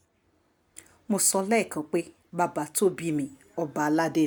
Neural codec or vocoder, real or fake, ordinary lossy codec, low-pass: none; real; none; none